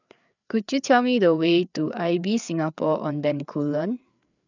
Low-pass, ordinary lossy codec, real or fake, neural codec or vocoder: 7.2 kHz; none; fake; codec, 16 kHz, 4 kbps, FreqCodec, larger model